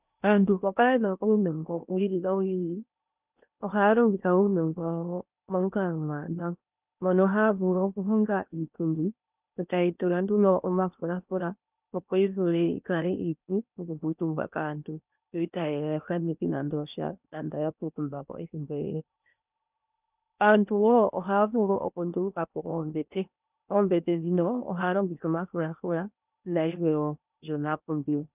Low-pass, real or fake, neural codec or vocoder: 3.6 kHz; fake; codec, 16 kHz in and 24 kHz out, 0.8 kbps, FocalCodec, streaming, 65536 codes